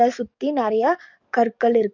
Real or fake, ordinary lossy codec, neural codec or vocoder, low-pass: fake; none; codec, 44.1 kHz, 7.8 kbps, DAC; 7.2 kHz